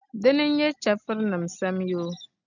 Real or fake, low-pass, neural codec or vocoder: real; 7.2 kHz; none